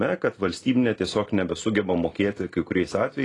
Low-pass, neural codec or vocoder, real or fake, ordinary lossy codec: 10.8 kHz; none; real; AAC, 32 kbps